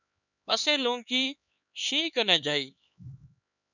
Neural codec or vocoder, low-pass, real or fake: codec, 16 kHz, 4 kbps, X-Codec, HuBERT features, trained on LibriSpeech; 7.2 kHz; fake